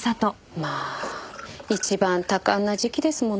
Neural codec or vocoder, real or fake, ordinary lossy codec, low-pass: none; real; none; none